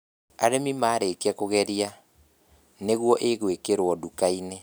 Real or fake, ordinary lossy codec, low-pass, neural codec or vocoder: real; none; none; none